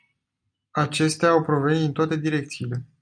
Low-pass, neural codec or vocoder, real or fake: 9.9 kHz; none; real